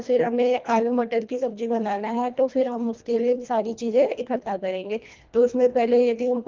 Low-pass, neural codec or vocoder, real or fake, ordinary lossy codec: 7.2 kHz; codec, 24 kHz, 1.5 kbps, HILCodec; fake; Opus, 32 kbps